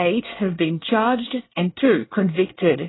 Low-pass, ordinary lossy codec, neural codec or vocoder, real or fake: 7.2 kHz; AAC, 16 kbps; codec, 24 kHz, 1 kbps, SNAC; fake